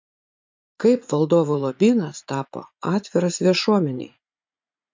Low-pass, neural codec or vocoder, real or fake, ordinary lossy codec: 7.2 kHz; vocoder, 44.1 kHz, 80 mel bands, Vocos; fake; MP3, 48 kbps